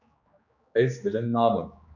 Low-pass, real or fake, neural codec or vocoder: 7.2 kHz; fake; codec, 16 kHz, 2 kbps, X-Codec, HuBERT features, trained on general audio